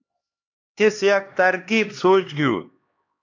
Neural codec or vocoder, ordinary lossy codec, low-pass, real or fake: codec, 16 kHz, 2 kbps, X-Codec, HuBERT features, trained on LibriSpeech; AAC, 48 kbps; 7.2 kHz; fake